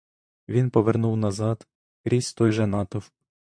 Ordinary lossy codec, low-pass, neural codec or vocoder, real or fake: MP3, 64 kbps; 9.9 kHz; vocoder, 44.1 kHz, 128 mel bands, Pupu-Vocoder; fake